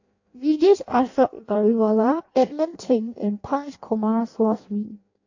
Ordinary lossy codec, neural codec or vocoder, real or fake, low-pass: AAC, 48 kbps; codec, 16 kHz in and 24 kHz out, 0.6 kbps, FireRedTTS-2 codec; fake; 7.2 kHz